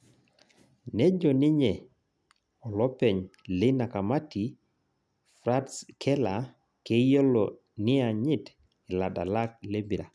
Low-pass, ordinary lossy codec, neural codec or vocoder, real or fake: none; none; none; real